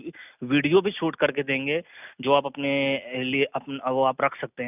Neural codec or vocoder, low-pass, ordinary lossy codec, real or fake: none; 3.6 kHz; none; real